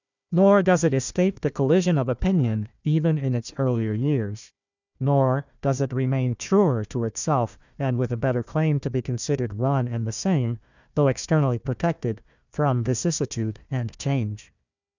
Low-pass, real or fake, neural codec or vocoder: 7.2 kHz; fake; codec, 16 kHz, 1 kbps, FunCodec, trained on Chinese and English, 50 frames a second